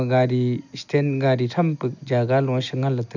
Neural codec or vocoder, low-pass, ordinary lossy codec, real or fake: none; 7.2 kHz; none; real